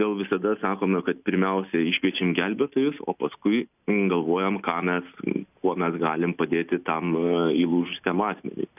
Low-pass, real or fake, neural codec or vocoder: 3.6 kHz; real; none